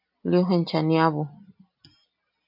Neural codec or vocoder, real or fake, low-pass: none; real; 5.4 kHz